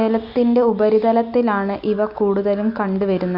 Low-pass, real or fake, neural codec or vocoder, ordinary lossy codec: 5.4 kHz; real; none; Opus, 64 kbps